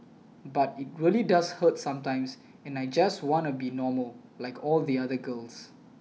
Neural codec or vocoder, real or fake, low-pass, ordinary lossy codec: none; real; none; none